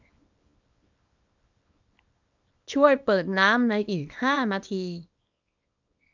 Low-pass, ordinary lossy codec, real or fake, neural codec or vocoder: 7.2 kHz; none; fake; codec, 24 kHz, 0.9 kbps, WavTokenizer, small release